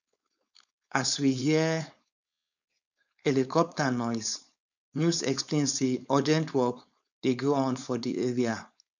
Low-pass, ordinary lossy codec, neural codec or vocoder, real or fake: 7.2 kHz; none; codec, 16 kHz, 4.8 kbps, FACodec; fake